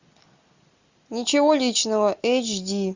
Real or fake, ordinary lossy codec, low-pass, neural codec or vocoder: real; Opus, 64 kbps; 7.2 kHz; none